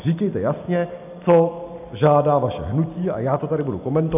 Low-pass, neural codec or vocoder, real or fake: 3.6 kHz; none; real